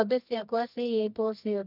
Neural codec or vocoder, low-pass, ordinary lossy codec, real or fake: codec, 24 kHz, 0.9 kbps, WavTokenizer, medium music audio release; 5.4 kHz; none; fake